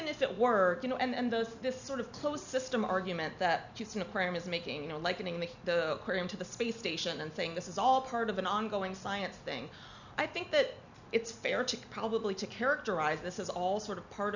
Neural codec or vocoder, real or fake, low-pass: none; real; 7.2 kHz